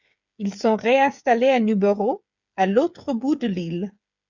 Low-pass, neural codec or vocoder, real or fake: 7.2 kHz; codec, 16 kHz, 8 kbps, FreqCodec, smaller model; fake